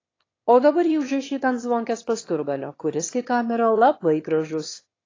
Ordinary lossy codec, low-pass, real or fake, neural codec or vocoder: AAC, 32 kbps; 7.2 kHz; fake; autoencoder, 22.05 kHz, a latent of 192 numbers a frame, VITS, trained on one speaker